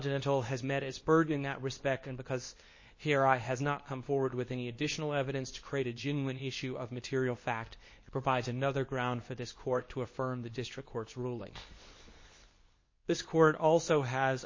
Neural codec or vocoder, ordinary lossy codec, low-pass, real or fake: codec, 24 kHz, 0.9 kbps, WavTokenizer, medium speech release version 2; MP3, 32 kbps; 7.2 kHz; fake